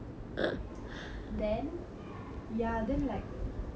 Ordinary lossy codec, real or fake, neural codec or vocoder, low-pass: none; real; none; none